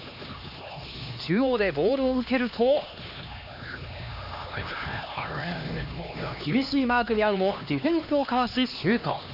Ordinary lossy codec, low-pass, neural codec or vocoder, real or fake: none; 5.4 kHz; codec, 16 kHz, 2 kbps, X-Codec, HuBERT features, trained on LibriSpeech; fake